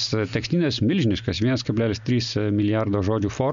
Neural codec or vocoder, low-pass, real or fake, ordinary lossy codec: none; 7.2 kHz; real; MP3, 64 kbps